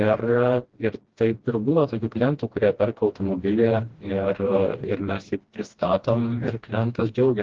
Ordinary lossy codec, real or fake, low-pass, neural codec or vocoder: Opus, 16 kbps; fake; 7.2 kHz; codec, 16 kHz, 1 kbps, FreqCodec, smaller model